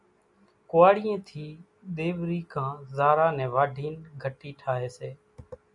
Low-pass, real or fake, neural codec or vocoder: 10.8 kHz; real; none